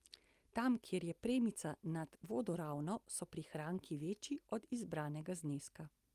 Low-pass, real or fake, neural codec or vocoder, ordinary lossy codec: 14.4 kHz; real; none; Opus, 32 kbps